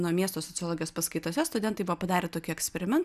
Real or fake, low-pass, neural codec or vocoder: real; 14.4 kHz; none